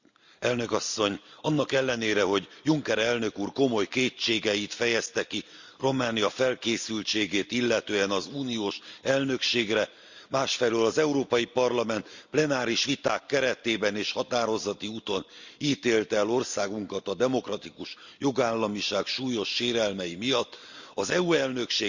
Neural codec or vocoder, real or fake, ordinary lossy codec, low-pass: none; real; Opus, 64 kbps; 7.2 kHz